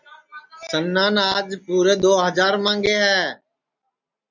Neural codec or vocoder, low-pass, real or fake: none; 7.2 kHz; real